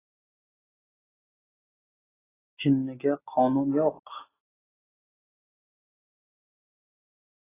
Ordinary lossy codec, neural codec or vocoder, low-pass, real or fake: AAC, 16 kbps; none; 3.6 kHz; real